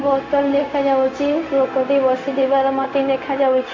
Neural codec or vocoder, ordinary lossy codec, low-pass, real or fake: codec, 16 kHz, 0.4 kbps, LongCat-Audio-Codec; AAC, 32 kbps; 7.2 kHz; fake